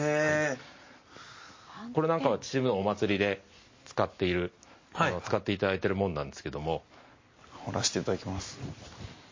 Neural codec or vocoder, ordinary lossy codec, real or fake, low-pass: none; MP3, 32 kbps; real; 7.2 kHz